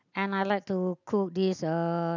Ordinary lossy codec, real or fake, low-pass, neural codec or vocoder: none; real; 7.2 kHz; none